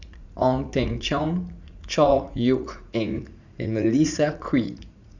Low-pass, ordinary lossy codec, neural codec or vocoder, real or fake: 7.2 kHz; none; vocoder, 44.1 kHz, 80 mel bands, Vocos; fake